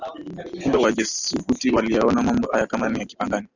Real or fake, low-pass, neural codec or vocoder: real; 7.2 kHz; none